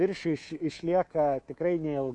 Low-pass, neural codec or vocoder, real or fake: 10.8 kHz; codec, 24 kHz, 3.1 kbps, DualCodec; fake